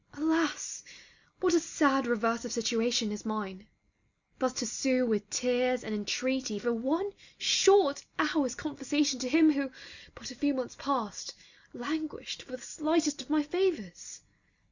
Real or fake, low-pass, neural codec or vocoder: real; 7.2 kHz; none